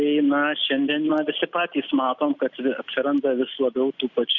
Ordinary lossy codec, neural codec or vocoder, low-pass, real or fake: Opus, 64 kbps; none; 7.2 kHz; real